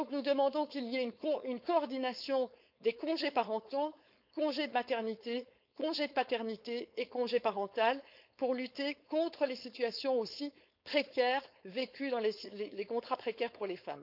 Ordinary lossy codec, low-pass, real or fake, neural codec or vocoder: none; 5.4 kHz; fake; codec, 16 kHz, 4.8 kbps, FACodec